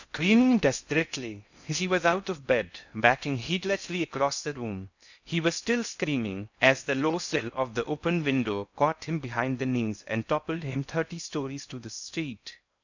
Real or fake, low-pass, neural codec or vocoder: fake; 7.2 kHz; codec, 16 kHz in and 24 kHz out, 0.6 kbps, FocalCodec, streaming, 2048 codes